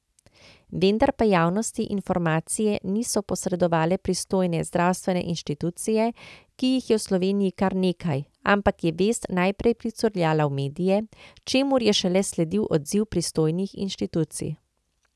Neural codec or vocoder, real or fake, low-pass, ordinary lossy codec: none; real; none; none